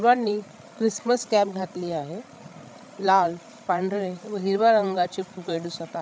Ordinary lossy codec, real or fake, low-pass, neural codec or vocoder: none; fake; none; codec, 16 kHz, 16 kbps, FreqCodec, larger model